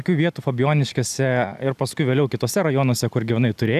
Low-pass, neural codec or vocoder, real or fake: 14.4 kHz; none; real